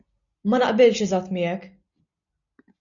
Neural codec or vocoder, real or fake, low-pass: none; real; 7.2 kHz